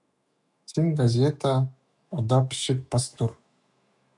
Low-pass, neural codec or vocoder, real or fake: 10.8 kHz; autoencoder, 48 kHz, 128 numbers a frame, DAC-VAE, trained on Japanese speech; fake